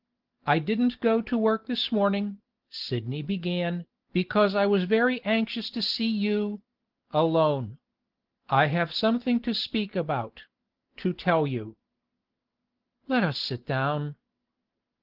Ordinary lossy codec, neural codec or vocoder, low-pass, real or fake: Opus, 16 kbps; none; 5.4 kHz; real